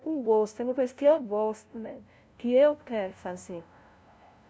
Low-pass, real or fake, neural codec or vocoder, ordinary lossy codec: none; fake; codec, 16 kHz, 0.5 kbps, FunCodec, trained on LibriTTS, 25 frames a second; none